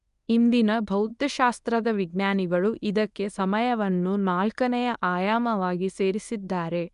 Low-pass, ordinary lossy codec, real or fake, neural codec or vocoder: 10.8 kHz; MP3, 96 kbps; fake; codec, 24 kHz, 0.9 kbps, WavTokenizer, medium speech release version 1